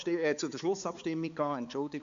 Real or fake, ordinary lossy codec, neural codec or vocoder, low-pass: fake; MP3, 48 kbps; codec, 16 kHz, 4 kbps, X-Codec, HuBERT features, trained on balanced general audio; 7.2 kHz